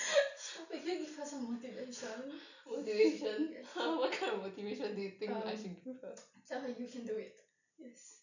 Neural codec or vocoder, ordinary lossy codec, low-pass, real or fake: none; none; 7.2 kHz; real